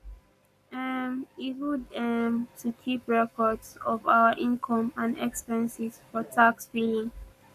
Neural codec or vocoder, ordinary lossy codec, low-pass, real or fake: codec, 44.1 kHz, 7.8 kbps, Pupu-Codec; none; 14.4 kHz; fake